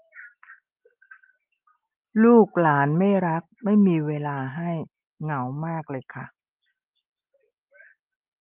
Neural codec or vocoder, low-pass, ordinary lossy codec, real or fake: none; 3.6 kHz; Opus, 32 kbps; real